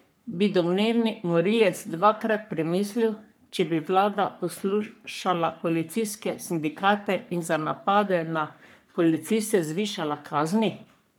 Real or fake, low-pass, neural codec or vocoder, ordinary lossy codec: fake; none; codec, 44.1 kHz, 3.4 kbps, Pupu-Codec; none